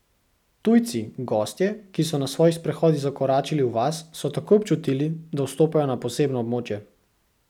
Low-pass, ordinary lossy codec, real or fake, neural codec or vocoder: 19.8 kHz; none; real; none